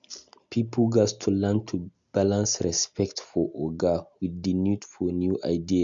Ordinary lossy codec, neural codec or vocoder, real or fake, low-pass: MP3, 64 kbps; none; real; 7.2 kHz